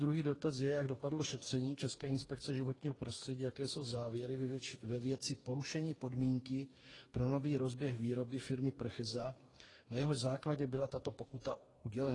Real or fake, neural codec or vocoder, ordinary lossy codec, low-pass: fake; codec, 44.1 kHz, 2.6 kbps, DAC; AAC, 32 kbps; 10.8 kHz